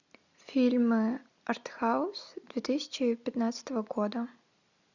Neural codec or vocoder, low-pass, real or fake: none; 7.2 kHz; real